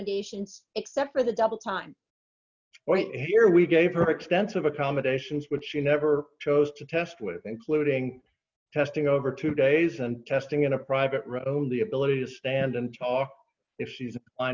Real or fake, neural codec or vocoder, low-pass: real; none; 7.2 kHz